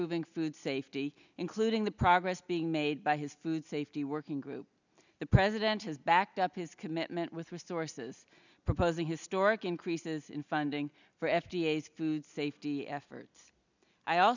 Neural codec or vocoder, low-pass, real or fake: none; 7.2 kHz; real